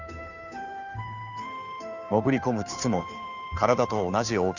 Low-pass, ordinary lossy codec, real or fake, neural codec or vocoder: 7.2 kHz; none; fake; codec, 16 kHz, 2 kbps, FunCodec, trained on Chinese and English, 25 frames a second